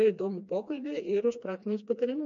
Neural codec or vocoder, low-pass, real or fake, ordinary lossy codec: codec, 16 kHz, 2 kbps, FreqCodec, smaller model; 7.2 kHz; fake; MP3, 48 kbps